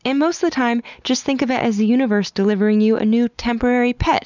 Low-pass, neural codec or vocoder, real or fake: 7.2 kHz; none; real